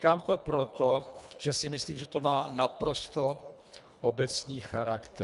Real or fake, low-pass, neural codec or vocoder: fake; 10.8 kHz; codec, 24 kHz, 1.5 kbps, HILCodec